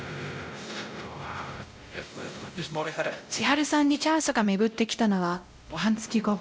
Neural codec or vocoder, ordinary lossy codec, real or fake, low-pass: codec, 16 kHz, 0.5 kbps, X-Codec, WavLM features, trained on Multilingual LibriSpeech; none; fake; none